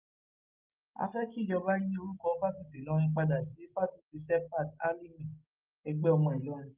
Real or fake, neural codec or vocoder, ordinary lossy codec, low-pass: real; none; Opus, 24 kbps; 3.6 kHz